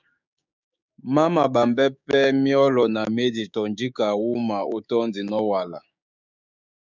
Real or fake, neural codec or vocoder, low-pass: fake; codec, 16 kHz, 6 kbps, DAC; 7.2 kHz